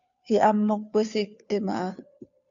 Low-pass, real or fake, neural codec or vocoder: 7.2 kHz; fake; codec, 16 kHz, 2 kbps, FunCodec, trained on Chinese and English, 25 frames a second